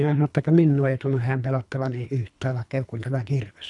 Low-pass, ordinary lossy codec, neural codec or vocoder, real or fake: 10.8 kHz; none; codec, 24 kHz, 3 kbps, HILCodec; fake